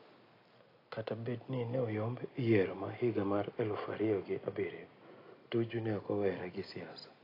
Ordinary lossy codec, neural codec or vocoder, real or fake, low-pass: AAC, 24 kbps; none; real; 5.4 kHz